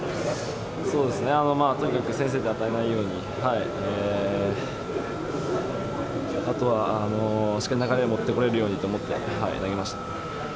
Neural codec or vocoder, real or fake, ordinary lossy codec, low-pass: none; real; none; none